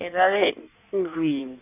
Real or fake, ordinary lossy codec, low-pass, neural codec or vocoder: fake; none; 3.6 kHz; codec, 16 kHz in and 24 kHz out, 1.1 kbps, FireRedTTS-2 codec